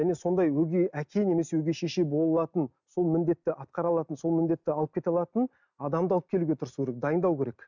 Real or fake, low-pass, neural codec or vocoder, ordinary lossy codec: real; 7.2 kHz; none; none